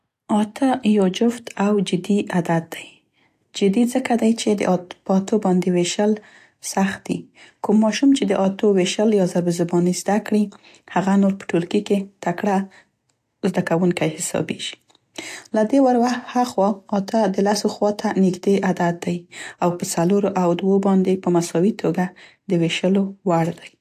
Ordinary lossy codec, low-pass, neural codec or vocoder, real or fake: AAC, 64 kbps; 14.4 kHz; none; real